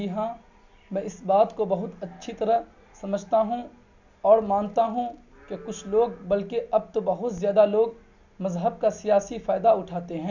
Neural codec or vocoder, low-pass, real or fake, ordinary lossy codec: none; 7.2 kHz; real; none